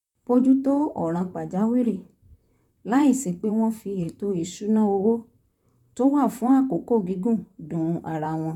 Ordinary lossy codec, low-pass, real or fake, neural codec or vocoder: none; 19.8 kHz; fake; vocoder, 44.1 kHz, 128 mel bands, Pupu-Vocoder